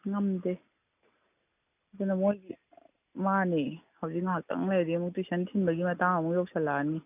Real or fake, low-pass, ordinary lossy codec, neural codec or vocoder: real; 3.6 kHz; none; none